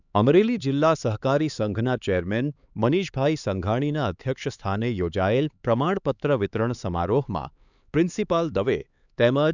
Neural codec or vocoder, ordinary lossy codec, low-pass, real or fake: codec, 16 kHz, 4 kbps, X-Codec, HuBERT features, trained on LibriSpeech; none; 7.2 kHz; fake